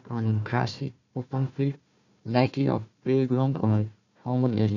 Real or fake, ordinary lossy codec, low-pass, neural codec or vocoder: fake; none; 7.2 kHz; codec, 16 kHz, 1 kbps, FunCodec, trained on Chinese and English, 50 frames a second